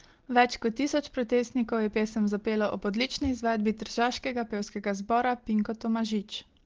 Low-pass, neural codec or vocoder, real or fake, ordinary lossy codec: 7.2 kHz; none; real; Opus, 16 kbps